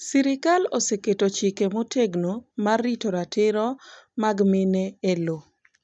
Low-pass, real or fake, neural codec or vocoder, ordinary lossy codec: none; real; none; none